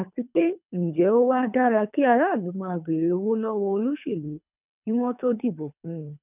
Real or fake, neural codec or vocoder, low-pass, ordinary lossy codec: fake; codec, 24 kHz, 3 kbps, HILCodec; 3.6 kHz; none